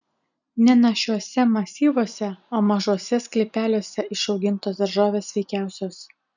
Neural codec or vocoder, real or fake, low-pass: none; real; 7.2 kHz